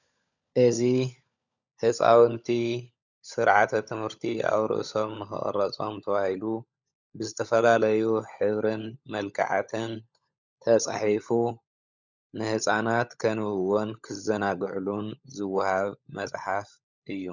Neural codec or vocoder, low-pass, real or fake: codec, 16 kHz, 16 kbps, FunCodec, trained on LibriTTS, 50 frames a second; 7.2 kHz; fake